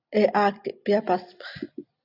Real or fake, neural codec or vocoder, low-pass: real; none; 5.4 kHz